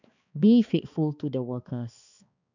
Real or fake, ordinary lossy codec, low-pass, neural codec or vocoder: fake; none; 7.2 kHz; codec, 16 kHz, 2 kbps, X-Codec, HuBERT features, trained on balanced general audio